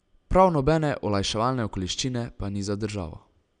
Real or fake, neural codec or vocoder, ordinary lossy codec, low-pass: real; none; none; 9.9 kHz